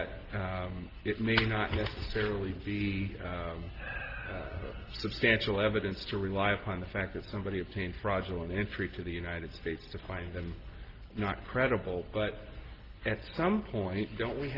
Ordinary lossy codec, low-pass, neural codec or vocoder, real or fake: Opus, 16 kbps; 5.4 kHz; none; real